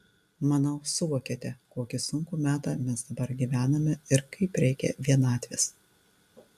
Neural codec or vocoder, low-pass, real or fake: none; 14.4 kHz; real